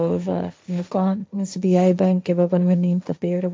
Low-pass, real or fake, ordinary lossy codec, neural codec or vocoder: none; fake; none; codec, 16 kHz, 1.1 kbps, Voila-Tokenizer